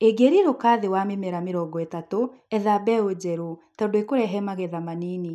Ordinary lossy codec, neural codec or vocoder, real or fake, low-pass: none; none; real; 14.4 kHz